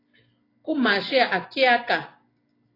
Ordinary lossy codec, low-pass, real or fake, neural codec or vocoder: AAC, 24 kbps; 5.4 kHz; real; none